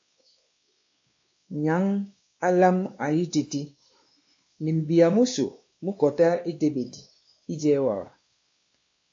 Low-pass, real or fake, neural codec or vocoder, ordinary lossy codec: 7.2 kHz; fake; codec, 16 kHz, 2 kbps, X-Codec, WavLM features, trained on Multilingual LibriSpeech; AAC, 48 kbps